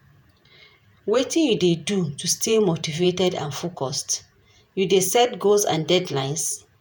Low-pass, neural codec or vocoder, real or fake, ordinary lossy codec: none; vocoder, 48 kHz, 128 mel bands, Vocos; fake; none